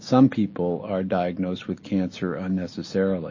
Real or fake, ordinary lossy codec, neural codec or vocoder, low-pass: real; MP3, 32 kbps; none; 7.2 kHz